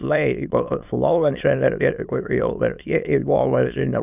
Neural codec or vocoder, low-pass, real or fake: autoencoder, 22.05 kHz, a latent of 192 numbers a frame, VITS, trained on many speakers; 3.6 kHz; fake